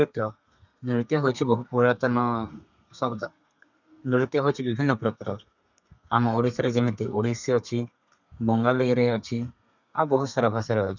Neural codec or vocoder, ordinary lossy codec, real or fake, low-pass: codec, 32 kHz, 1.9 kbps, SNAC; none; fake; 7.2 kHz